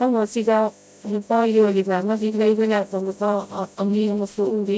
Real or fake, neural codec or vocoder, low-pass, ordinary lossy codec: fake; codec, 16 kHz, 0.5 kbps, FreqCodec, smaller model; none; none